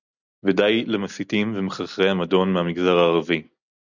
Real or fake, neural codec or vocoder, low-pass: real; none; 7.2 kHz